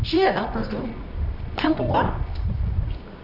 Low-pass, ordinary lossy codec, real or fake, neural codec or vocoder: 5.4 kHz; none; fake; codec, 24 kHz, 0.9 kbps, WavTokenizer, medium music audio release